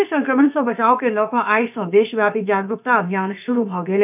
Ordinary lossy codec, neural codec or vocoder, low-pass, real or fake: none; codec, 16 kHz, about 1 kbps, DyCAST, with the encoder's durations; 3.6 kHz; fake